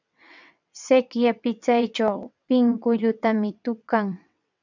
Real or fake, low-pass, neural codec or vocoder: fake; 7.2 kHz; vocoder, 22.05 kHz, 80 mel bands, WaveNeXt